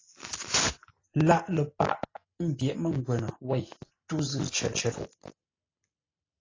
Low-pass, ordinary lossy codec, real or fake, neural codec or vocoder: 7.2 kHz; AAC, 32 kbps; real; none